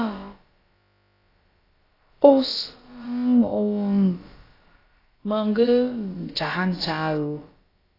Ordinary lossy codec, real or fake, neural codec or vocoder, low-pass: AAC, 24 kbps; fake; codec, 16 kHz, about 1 kbps, DyCAST, with the encoder's durations; 5.4 kHz